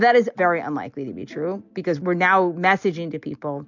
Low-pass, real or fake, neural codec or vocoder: 7.2 kHz; real; none